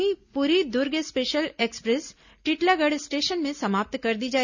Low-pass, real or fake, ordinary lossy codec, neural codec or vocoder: 7.2 kHz; real; none; none